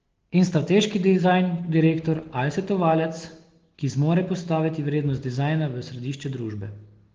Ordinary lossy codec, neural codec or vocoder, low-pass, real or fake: Opus, 16 kbps; none; 7.2 kHz; real